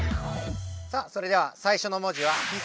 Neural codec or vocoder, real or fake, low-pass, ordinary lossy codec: none; real; none; none